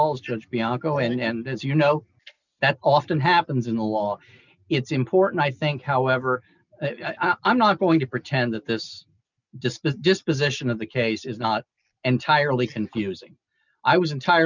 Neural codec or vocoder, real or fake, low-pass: none; real; 7.2 kHz